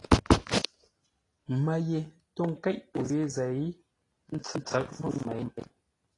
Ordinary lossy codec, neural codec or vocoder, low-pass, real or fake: AAC, 32 kbps; none; 10.8 kHz; real